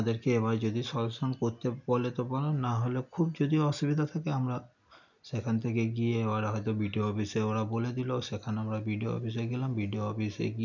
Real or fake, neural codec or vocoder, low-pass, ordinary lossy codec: real; none; 7.2 kHz; none